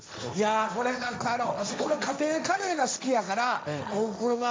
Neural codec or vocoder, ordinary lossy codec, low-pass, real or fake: codec, 16 kHz, 1.1 kbps, Voila-Tokenizer; none; none; fake